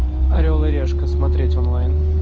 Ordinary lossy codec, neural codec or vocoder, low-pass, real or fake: Opus, 24 kbps; none; 7.2 kHz; real